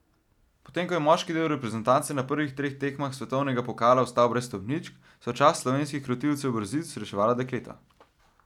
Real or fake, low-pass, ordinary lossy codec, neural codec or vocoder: real; 19.8 kHz; none; none